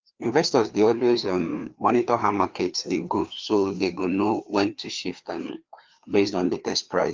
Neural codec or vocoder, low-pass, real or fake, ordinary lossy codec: codec, 16 kHz, 2 kbps, FreqCodec, larger model; 7.2 kHz; fake; Opus, 32 kbps